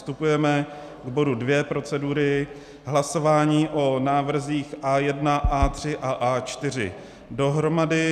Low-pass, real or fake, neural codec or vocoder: 14.4 kHz; real; none